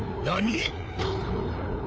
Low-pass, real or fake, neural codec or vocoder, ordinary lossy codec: none; fake; codec, 16 kHz, 4 kbps, FreqCodec, larger model; none